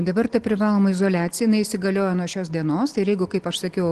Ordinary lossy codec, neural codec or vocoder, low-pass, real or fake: Opus, 16 kbps; none; 10.8 kHz; real